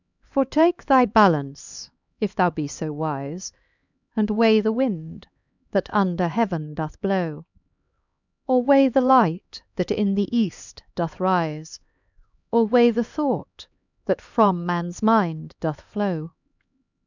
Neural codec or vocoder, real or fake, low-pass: codec, 16 kHz, 2 kbps, X-Codec, HuBERT features, trained on LibriSpeech; fake; 7.2 kHz